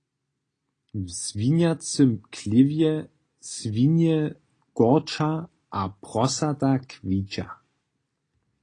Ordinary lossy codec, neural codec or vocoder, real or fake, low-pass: AAC, 32 kbps; none; real; 9.9 kHz